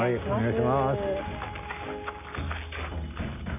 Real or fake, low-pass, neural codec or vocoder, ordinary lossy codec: real; 3.6 kHz; none; none